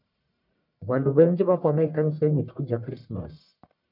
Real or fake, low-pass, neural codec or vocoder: fake; 5.4 kHz; codec, 44.1 kHz, 1.7 kbps, Pupu-Codec